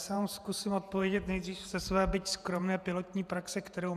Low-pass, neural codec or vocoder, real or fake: 14.4 kHz; vocoder, 48 kHz, 128 mel bands, Vocos; fake